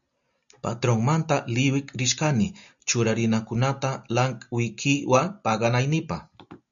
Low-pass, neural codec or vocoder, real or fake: 7.2 kHz; none; real